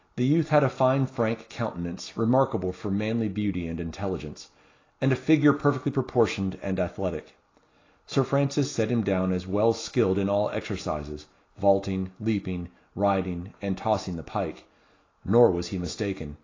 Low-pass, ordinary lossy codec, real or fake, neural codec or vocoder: 7.2 kHz; AAC, 32 kbps; real; none